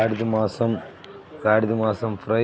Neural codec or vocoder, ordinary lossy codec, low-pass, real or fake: none; none; none; real